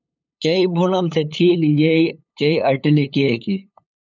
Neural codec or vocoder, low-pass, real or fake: codec, 16 kHz, 8 kbps, FunCodec, trained on LibriTTS, 25 frames a second; 7.2 kHz; fake